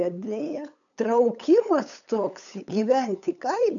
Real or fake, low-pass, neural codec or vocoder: fake; 7.2 kHz; codec, 16 kHz, 8 kbps, FunCodec, trained on LibriTTS, 25 frames a second